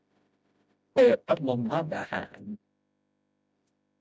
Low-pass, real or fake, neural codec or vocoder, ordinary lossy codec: none; fake; codec, 16 kHz, 0.5 kbps, FreqCodec, smaller model; none